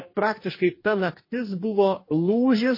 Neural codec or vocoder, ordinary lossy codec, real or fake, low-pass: codec, 44.1 kHz, 2.6 kbps, DAC; MP3, 24 kbps; fake; 5.4 kHz